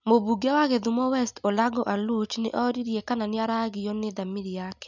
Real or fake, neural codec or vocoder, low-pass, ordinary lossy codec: real; none; 7.2 kHz; none